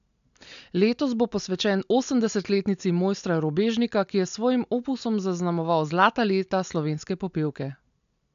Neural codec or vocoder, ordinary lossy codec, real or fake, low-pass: none; AAC, 96 kbps; real; 7.2 kHz